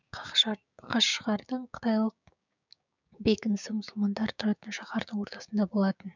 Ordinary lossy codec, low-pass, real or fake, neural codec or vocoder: none; 7.2 kHz; fake; codec, 16 kHz, 6 kbps, DAC